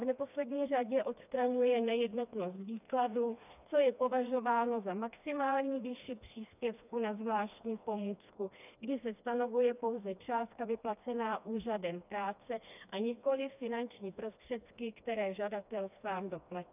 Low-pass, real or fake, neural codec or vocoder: 3.6 kHz; fake; codec, 16 kHz, 2 kbps, FreqCodec, smaller model